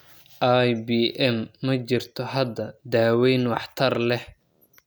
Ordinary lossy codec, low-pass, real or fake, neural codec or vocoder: none; none; real; none